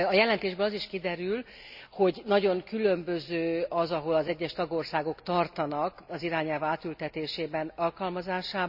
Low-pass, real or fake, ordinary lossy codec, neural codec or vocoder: 5.4 kHz; real; none; none